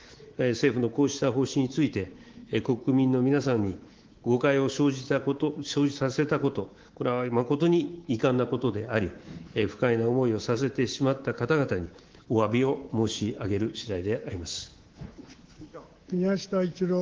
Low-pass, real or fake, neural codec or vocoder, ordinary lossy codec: 7.2 kHz; fake; codec, 24 kHz, 3.1 kbps, DualCodec; Opus, 16 kbps